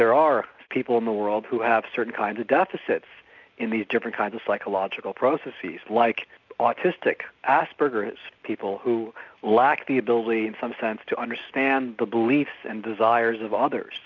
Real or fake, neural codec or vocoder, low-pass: real; none; 7.2 kHz